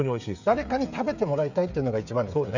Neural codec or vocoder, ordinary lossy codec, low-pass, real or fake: codec, 16 kHz, 16 kbps, FreqCodec, smaller model; none; 7.2 kHz; fake